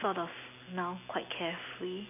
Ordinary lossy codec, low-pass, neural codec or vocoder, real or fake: none; 3.6 kHz; none; real